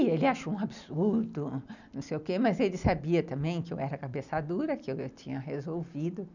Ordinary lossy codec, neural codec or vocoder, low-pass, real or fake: none; none; 7.2 kHz; real